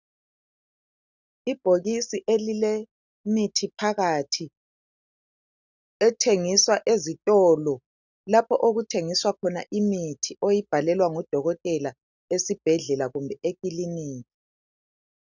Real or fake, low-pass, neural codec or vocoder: real; 7.2 kHz; none